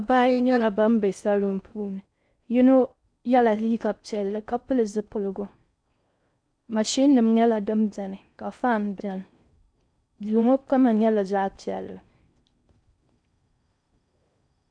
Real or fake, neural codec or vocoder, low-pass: fake; codec, 16 kHz in and 24 kHz out, 0.6 kbps, FocalCodec, streaming, 4096 codes; 9.9 kHz